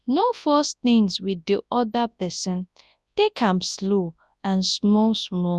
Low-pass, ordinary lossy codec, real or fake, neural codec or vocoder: none; none; fake; codec, 24 kHz, 0.9 kbps, WavTokenizer, large speech release